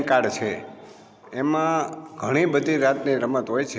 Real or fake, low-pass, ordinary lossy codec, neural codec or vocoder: real; none; none; none